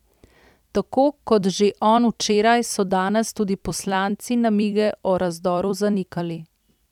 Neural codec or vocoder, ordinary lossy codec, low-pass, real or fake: vocoder, 44.1 kHz, 128 mel bands every 256 samples, BigVGAN v2; none; 19.8 kHz; fake